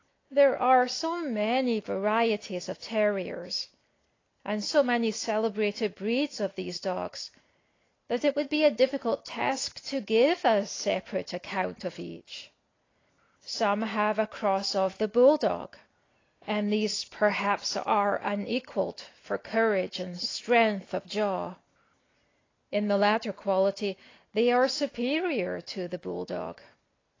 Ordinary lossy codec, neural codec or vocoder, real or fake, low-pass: AAC, 32 kbps; none; real; 7.2 kHz